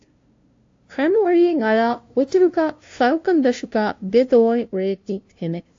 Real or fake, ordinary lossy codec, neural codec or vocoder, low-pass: fake; AAC, 48 kbps; codec, 16 kHz, 0.5 kbps, FunCodec, trained on LibriTTS, 25 frames a second; 7.2 kHz